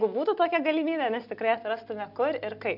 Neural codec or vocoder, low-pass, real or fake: none; 5.4 kHz; real